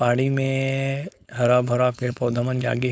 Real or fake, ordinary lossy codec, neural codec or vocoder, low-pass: fake; none; codec, 16 kHz, 4.8 kbps, FACodec; none